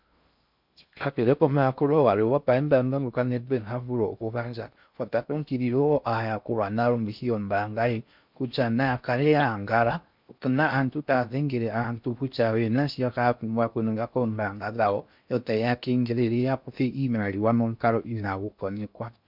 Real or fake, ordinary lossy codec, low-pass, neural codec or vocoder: fake; MP3, 48 kbps; 5.4 kHz; codec, 16 kHz in and 24 kHz out, 0.6 kbps, FocalCodec, streaming, 4096 codes